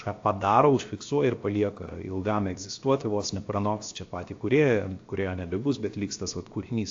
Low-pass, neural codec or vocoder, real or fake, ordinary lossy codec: 7.2 kHz; codec, 16 kHz, 0.7 kbps, FocalCodec; fake; AAC, 48 kbps